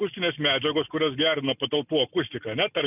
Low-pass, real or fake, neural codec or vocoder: 3.6 kHz; real; none